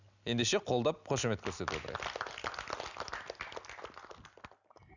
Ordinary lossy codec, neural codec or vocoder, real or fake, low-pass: none; none; real; 7.2 kHz